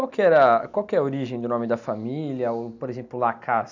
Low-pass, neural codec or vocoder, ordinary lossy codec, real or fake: 7.2 kHz; none; none; real